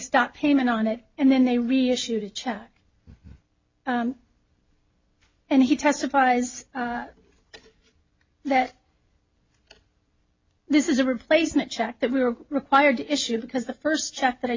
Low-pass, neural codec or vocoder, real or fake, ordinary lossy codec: 7.2 kHz; none; real; MP3, 64 kbps